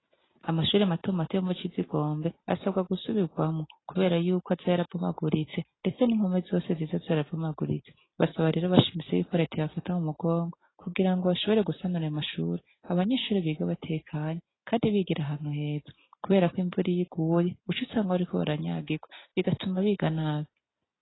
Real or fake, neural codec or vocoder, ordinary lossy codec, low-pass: real; none; AAC, 16 kbps; 7.2 kHz